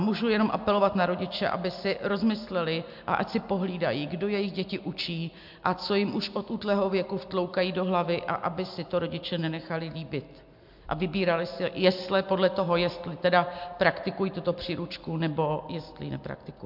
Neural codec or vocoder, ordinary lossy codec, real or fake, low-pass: none; MP3, 48 kbps; real; 5.4 kHz